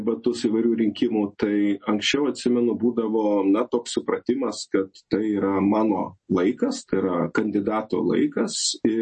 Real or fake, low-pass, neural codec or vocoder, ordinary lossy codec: real; 10.8 kHz; none; MP3, 32 kbps